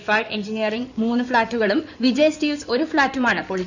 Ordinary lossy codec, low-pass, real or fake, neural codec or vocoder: none; 7.2 kHz; fake; codec, 16 kHz in and 24 kHz out, 2.2 kbps, FireRedTTS-2 codec